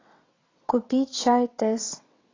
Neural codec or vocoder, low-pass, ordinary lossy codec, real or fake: vocoder, 22.05 kHz, 80 mel bands, WaveNeXt; 7.2 kHz; AAC, 32 kbps; fake